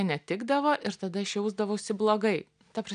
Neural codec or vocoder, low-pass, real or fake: none; 9.9 kHz; real